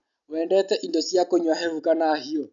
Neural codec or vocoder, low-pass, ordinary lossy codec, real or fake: none; 7.2 kHz; none; real